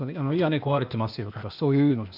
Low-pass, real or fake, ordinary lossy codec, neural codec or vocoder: 5.4 kHz; fake; none; codec, 16 kHz, 0.8 kbps, ZipCodec